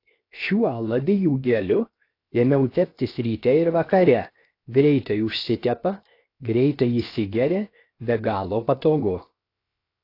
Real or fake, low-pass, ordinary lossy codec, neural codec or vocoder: fake; 5.4 kHz; AAC, 32 kbps; codec, 16 kHz, 0.7 kbps, FocalCodec